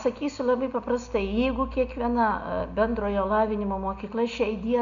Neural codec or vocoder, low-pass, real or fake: none; 7.2 kHz; real